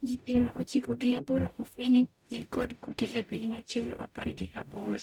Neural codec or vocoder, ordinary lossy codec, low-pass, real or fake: codec, 44.1 kHz, 0.9 kbps, DAC; none; 19.8 kHz; fake